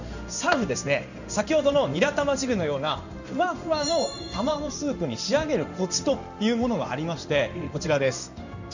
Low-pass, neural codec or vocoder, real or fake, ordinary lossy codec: 7.2 kHz; codec, 16 kHz in and 24 kHz out, 1 kbps, XY-Tokenizer; fake; none